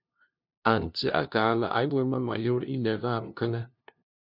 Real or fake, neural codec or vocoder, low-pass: fake; codec, 16 kHz, 0.5 kbps, FunCodec, trained on LibriTTS, 25 frames a second; 5.4 kHz